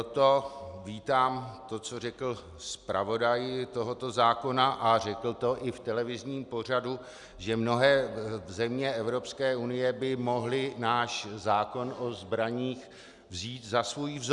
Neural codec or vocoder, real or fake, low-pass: none; real; 10.8 kHz